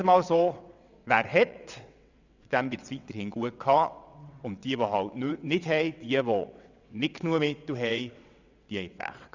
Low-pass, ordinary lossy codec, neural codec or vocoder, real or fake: 7.2 kHz; none; vocoder, 22.05 kHz, 80 mel bands, WaveNeXt; fake